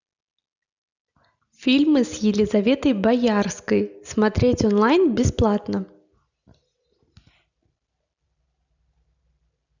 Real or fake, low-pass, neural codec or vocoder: real; 7.2 kHz; none